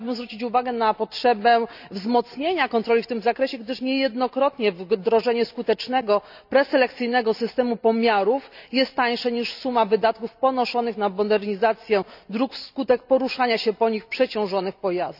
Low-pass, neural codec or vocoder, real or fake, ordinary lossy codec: 5.4 kHz; none; real; none